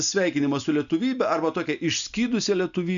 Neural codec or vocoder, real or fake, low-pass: none; real; 7.2 kHz